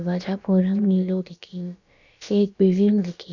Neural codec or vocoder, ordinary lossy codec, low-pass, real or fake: codec, 16 kHz, about 1 kbps, DyCAST, with the encoder's durations; none; 7.2 kHz; fake